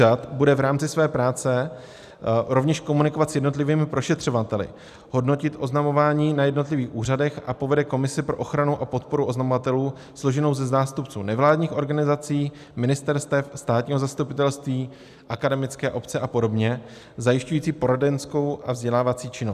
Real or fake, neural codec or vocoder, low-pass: real; none; 14.4 kHz